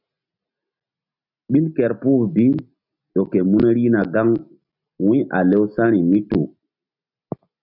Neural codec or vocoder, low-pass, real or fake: none; 5.4 kHz; real